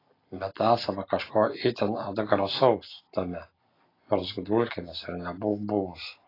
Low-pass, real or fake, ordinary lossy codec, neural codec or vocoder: 5.4 kHz; real; AAC, 24 kbps; none